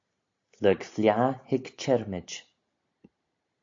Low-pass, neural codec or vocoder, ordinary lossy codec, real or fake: 7.2 kHz; none; AAC, 48 kbps; real